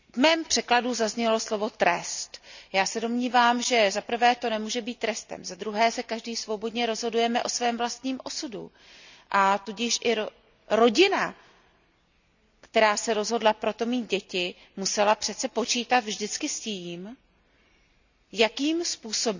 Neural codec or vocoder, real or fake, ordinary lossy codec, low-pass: none; real; none; 7.2 kHz